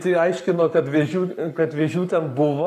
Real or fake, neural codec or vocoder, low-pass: fake; codec, 44.1 kHz, 7.8 kbps, Pupu-Codec; 14.4 kHz